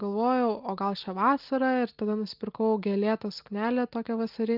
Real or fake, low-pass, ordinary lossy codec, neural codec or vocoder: real; 5.4 kHz; Opus, 24 kbps; none